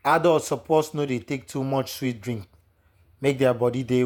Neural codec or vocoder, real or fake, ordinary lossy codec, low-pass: vocoder, 48 kHz, 128 mel bands, Vocos; fake; none; 19.8 kHz